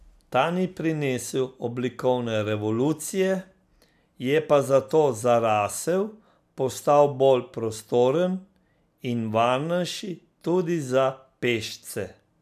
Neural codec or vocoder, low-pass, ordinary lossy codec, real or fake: none; 14.4 kHz; none; real